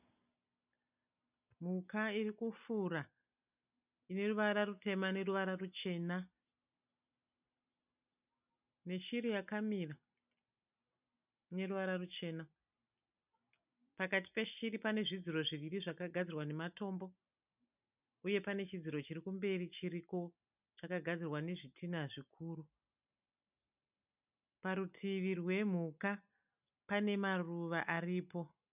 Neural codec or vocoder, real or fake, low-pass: none; real; 3.6 kHz